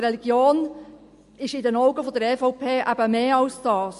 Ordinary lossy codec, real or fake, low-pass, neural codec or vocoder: MP3, 48 kbps; real; 14.4 kHz; none